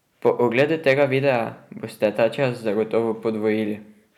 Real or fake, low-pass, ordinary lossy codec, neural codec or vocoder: real; 19.8 kHz; none; none